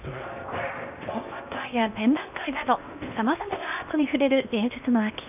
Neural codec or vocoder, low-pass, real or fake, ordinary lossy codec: codec, 16 kHz in and 24 kHz out, 0.8 kbps, FocalCodec, streaming, 65536 codes; 3.6 kHz; fake; none